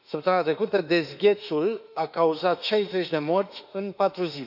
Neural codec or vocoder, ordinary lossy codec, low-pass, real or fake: autoencoder, 48 kHz, 32 numbers a frame, DAC-VAE, trained on Japanese speech; none; 5.4 kHz; fake